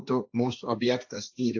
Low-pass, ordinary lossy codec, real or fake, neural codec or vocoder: 7.2 kHz; AAC, 48 kbps; fake; codec, 16 kHz, 1.1 kbps, Voila-Tokenizer